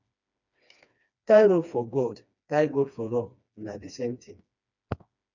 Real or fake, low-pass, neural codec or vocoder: fake; 7.2 kHz; codec, 16 kHz, 2 kbps, FreqCodec, smaller model